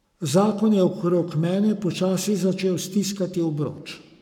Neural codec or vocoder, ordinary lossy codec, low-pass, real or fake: codec, 44.1 kHz, 7.8 kbps, Pupu-Codec; none; 19.8 kHz; fake